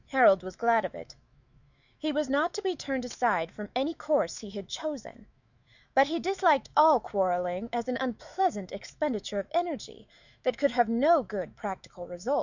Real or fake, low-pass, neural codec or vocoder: fake; 7.2 kHz; autoencoder, 48 kHz, 128 numbers a frame, DAC-VAE, trained on Japanese speech